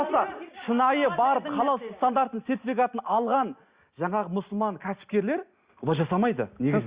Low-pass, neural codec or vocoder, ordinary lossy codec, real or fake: 3.6 kHz; none; Opus, 64 kbps; real